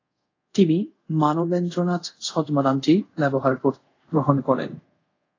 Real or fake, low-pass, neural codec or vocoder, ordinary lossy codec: fake; 7.2 kHz; codec, 24 kHz, 0.5 kbps, DualCodec; AAC, 32 kbps